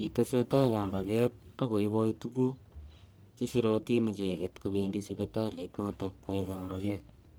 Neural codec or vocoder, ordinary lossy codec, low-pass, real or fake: codec, 44.1 kHz, 1.7 kbps, Pupu-Codec; none; none; fake